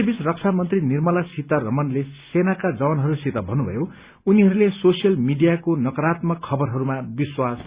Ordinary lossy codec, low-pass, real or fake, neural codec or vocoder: Opus, 64 kbps; 3.6 kHz; real; none